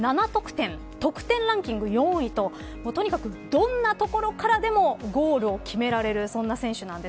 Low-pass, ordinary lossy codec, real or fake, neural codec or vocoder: none; none; real; none